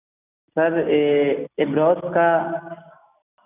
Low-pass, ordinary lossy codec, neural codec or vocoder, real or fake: 3.6 kHz; none; none; real